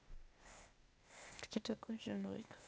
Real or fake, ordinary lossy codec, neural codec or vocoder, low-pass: fake; none; codec, 16 kHz, 0.8 kbps, ZipCodec; none